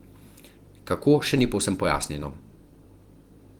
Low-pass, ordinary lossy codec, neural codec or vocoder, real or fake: 19.8 kHz; Opus, 24 kbps; vocoder, 44.1 kHz, 128 mel bands every 256 samples, BigVGAN v2; fake